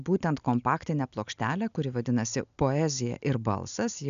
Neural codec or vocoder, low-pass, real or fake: none; 7.2 kHz; real